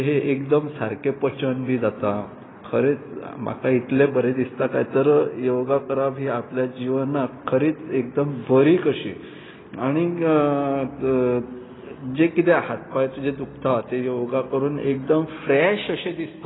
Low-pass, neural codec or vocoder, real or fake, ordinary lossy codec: 7.2 kHz; none; real; AAC, 16 kbps